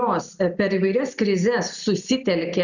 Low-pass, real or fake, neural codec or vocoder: 7.2 kHz; real; none